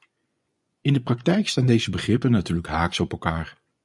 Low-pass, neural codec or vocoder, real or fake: 10.8 kHz; vocoder, 24 kHz, 100 mel bands, Vocos; fake